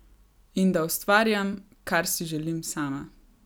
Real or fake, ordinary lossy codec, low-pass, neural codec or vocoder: real; none; none; none